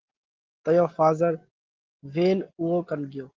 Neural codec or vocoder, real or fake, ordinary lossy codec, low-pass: none; real; Opus, 16 kbps; 7.2 kHz